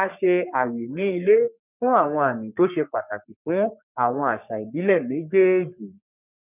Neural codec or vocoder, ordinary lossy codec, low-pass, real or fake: codec, 44.1 kHz, 3.4 kbps, Pupu-Codec; none; 3.6 kHz; fake